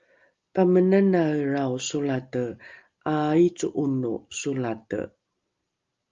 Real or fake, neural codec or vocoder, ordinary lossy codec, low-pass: real; none; Opus, 32 kbps; 7.2 kHz